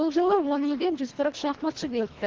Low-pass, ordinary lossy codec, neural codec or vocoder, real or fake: 7.2 kHz; Opus, 16 kbps; codec, 24 kHz, 1.5 kbps, HILCodec; fake